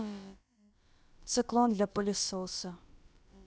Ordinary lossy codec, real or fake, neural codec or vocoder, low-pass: none; fake; codec, 16 kHz, about 1 kbps, DyCAST, with the encoder's durations; none